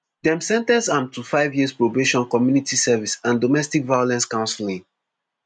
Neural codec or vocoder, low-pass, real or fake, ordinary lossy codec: none; 9.9 kHz; real; MP3, 96 kbps